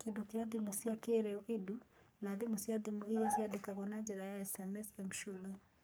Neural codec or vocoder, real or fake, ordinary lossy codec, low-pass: codec, 44.1 kHz, 3.4 kbps, Pupu-Codec; fake; none; none